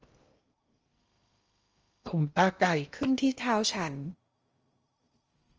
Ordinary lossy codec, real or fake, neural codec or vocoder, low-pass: Opus, 24 kbps; fake; codec, 16 kHz in and 24 kHz out, 0.8 kbps, FocalCodec, streaming, 65536 codes; 7.2 kHz